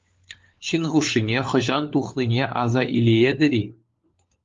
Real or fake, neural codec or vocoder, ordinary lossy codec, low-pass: fake; codec, 16 kHz, 4 kbps, FunCodec, trained on LibriTTS, 50 frames a second; Opus, 32 kbps; 7.2 kHz